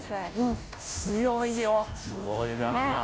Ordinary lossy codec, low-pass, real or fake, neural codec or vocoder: none; none; fake; codec, 16 kHz, 0.5 kbps, FunCodec, trained on Chinese and English, 25 frames a second